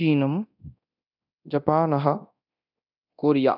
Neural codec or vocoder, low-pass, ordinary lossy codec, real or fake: codec, 24 kHz, 0.9 kbps, DualCodec; 5.4 kHz; none; fake